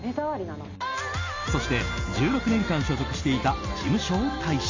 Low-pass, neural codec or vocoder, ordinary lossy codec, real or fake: 7.2 kHz; none; AAC, 48 kbps; real